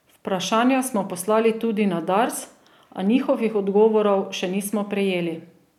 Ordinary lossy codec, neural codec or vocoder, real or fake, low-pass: none; none; real; 19.8 kHz